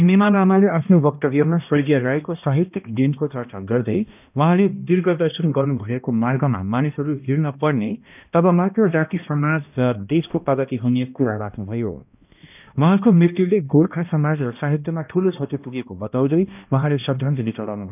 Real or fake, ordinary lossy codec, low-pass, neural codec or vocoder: fake; none; 3.6 kHz; codec, 16 kHz, 1 kbps, X-Codec, HuBERT features, trained on balanced general audio